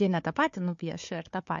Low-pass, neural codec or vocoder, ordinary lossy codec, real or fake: 7.2 kHz; codec, 16 kHz, 4 kbps, FunCodec, trained on Chinese and English, 50 frames a second; MP3, 48 kbps; fake